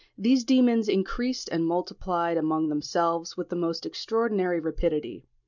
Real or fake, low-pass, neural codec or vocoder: real; 7.2 kHz; none